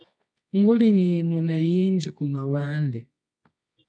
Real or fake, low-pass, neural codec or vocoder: fake; 9.9 kHz; codec, 24 kHz, 0.9 kbps, WavTokenizer, medium music audio release